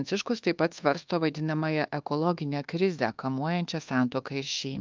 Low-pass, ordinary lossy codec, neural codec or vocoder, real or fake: 7.2 kHz; Opus, 24 kbps; codec, 24 kHz, 1.2 kbps, DualCodec; fake